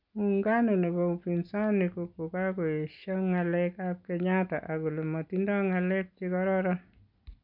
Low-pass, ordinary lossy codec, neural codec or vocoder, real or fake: 5.4 kHz; none; none; real